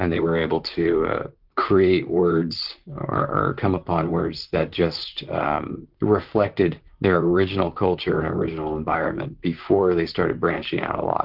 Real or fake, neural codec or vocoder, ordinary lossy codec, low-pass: fake; vocoder, 44.1 kHz, 128 mel bands, Pupu-Vocoder; Opus, 32 kbps; 5.4 kHz